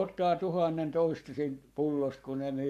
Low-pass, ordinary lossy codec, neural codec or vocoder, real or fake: 14.4 kHz; none; codec, 44.1 kHz, 7.8 kbps, Pupu-Codec; fake